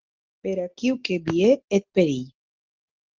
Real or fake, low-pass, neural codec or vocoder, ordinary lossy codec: real; 7.2 kHz; none; Opus, 16 kbps